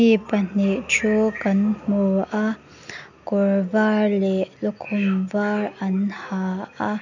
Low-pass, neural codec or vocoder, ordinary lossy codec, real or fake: 7.2 kHz; none; none; real